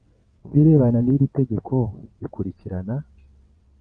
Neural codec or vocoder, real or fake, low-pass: vocoder, 22.05 kHz, 80 mel bands, WaveNeXt; fake; 9.9 kHz